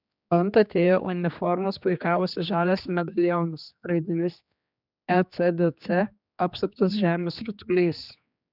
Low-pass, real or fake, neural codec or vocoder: 5.4 kHz; fake; codec, 16 kHz, 2 kbps, X-Codec, HuBERT features, trained on general audio